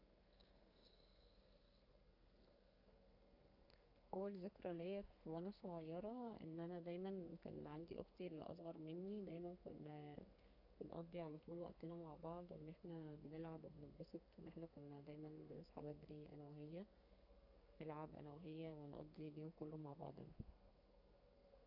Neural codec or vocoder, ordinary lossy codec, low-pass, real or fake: codec, 32 kHz, 1.9 kbps, SNAC; none; 5.4 kHz; fake